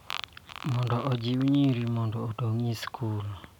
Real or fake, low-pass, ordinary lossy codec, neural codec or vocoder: real; 19.8 kHz; none; none